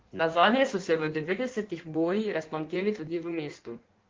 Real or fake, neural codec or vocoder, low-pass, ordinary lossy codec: fake; codec, 16 kHz in and 24 kHz out, 1.1 kbps, FireRedTTS-2 codec; 7.2 kHz; Opus, 16 kbps